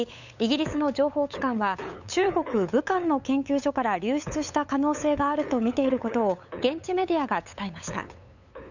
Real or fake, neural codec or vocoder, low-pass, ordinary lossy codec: fake; codec, 16 kHz, 8 kbps, FunCodec, trained on LibriTTS, 25 frames a second; 7.2 kHz; none